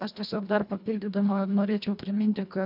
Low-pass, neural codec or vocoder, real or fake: 5.4 kHz; codec, 24 kHz, 1.5 kbps, HILCodec; fake